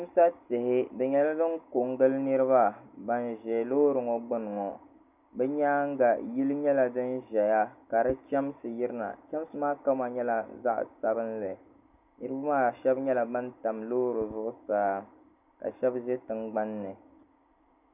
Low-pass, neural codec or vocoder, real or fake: 3.6 kHz; none; real